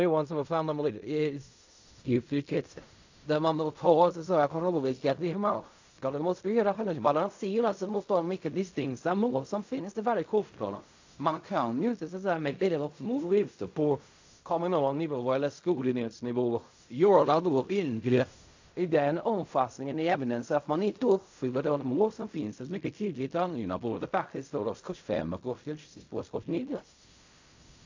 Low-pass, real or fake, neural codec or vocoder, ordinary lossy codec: 7.2 kHz; fake; codec, 16 kHz in and 24 kHz out, 0.4 kbps, LongCat-Audio-Codec, fine tuned four codebook decoder; AAC, 48 kbps